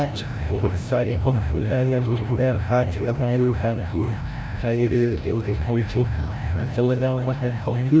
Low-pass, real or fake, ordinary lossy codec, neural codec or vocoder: none; fake; none; codec, 16 kHz, 0.5 kbps, FreqCodec, larger model